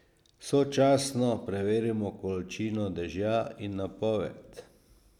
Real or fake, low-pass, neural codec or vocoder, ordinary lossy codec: real; 19.8 kHz; none; none